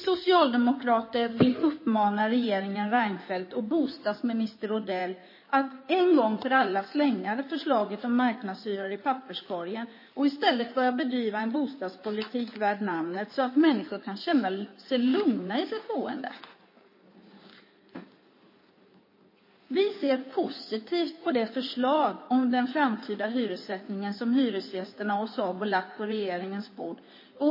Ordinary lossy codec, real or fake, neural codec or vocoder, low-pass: MP3, 24 kbps; fake; codec, 16 kHz in and 24 kHz out, 2.2 kbps, FireRedTTS-2 codec; 5.4 kHz